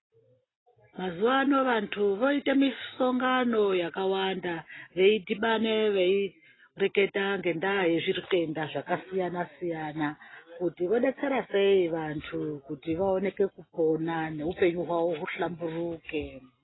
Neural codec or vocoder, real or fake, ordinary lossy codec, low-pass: none; real; AAC, 16 kbps; 7.2 kHz